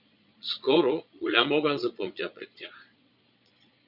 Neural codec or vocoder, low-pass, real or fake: vocoder, 22.05 kHz, 80 mel bands, Vocos; 5.4 kHz; fake